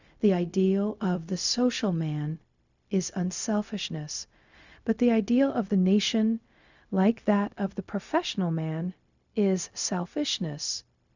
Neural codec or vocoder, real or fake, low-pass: codec, 16 kHz, 0.4 kbps, LongCat-Audio-Codec; fake; 7.2 kHz